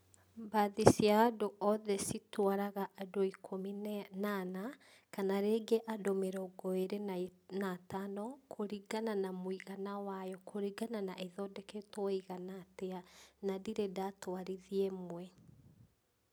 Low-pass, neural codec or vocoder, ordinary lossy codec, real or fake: none; none; none; real